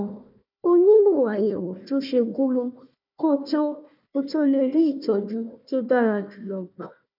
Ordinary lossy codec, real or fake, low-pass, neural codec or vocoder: none; fake; 5.4 kHz; codec, 16 kHz, 1 kbps, FunCodec, trained on Chinese and English, 50 frames a second